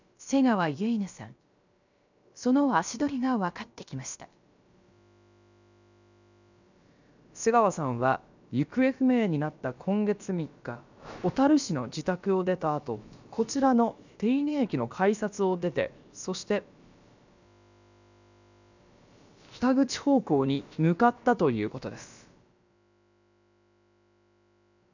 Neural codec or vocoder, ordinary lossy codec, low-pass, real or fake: codec, 16 kHz, about 1 kbps, DyCAST, with the encoder's durations; none; 7.2 kHz; fake